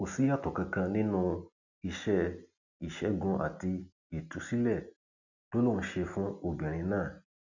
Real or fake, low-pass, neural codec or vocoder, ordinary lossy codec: real; 7.2 kHz; none; none